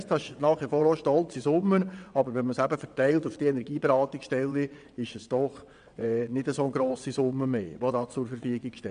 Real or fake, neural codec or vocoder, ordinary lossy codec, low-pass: fake; vocoder, 22.05 kHz, 80 mel bands, WaveNeXt; AAC, 96 kbps; 9.9 kHz